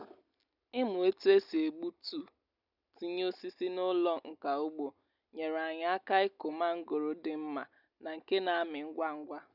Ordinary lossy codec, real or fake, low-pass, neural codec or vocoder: none; real; 5.4 kHz; none